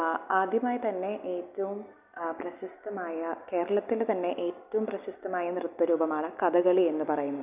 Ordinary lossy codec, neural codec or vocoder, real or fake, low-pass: none; none; real; 3.6 kHz